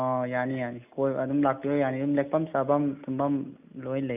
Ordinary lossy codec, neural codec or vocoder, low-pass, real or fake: none; none; 3.6 kHz; real